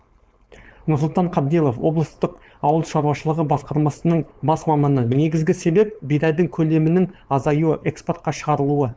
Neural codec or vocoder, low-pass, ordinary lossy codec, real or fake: codec, 16 kHz, 4.8 kbps, FACodec; none; none; fake